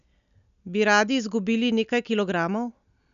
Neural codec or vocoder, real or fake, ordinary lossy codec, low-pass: none; real; none; 7.2 kHz